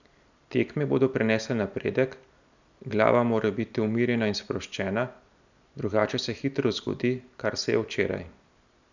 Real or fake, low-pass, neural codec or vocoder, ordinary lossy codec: real; 7.2 kHz; none; none